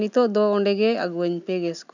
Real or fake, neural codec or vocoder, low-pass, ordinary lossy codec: real; none; 7.2 kHz; none